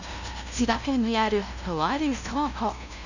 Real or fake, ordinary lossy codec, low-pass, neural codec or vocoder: fake; none; 7.2 kHz; codec, 16 kHz, 0.5 kbps, FunCodec, trained on LibriTTS, 25 frames a second